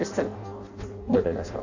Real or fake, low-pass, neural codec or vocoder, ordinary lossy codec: fake; 7.2 kHz; codec, 16 kHz in and 24 kHz out, 0.6 kbps, FireRedTTS-2 codec; AAC, 48 kbps